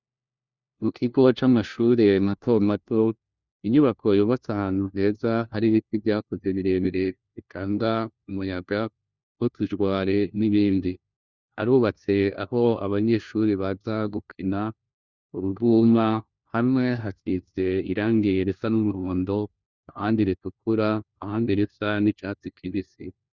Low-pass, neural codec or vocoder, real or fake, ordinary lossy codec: 7.2 kHz; codec, 16 kHz, 1 kbps, FunCodec, trained on LibriTTS, 50 frames a second; fake; Opus, 64 kbps